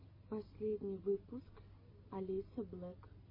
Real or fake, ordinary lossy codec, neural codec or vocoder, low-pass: real; MP3, 24 kbps; none; 5.4 kHz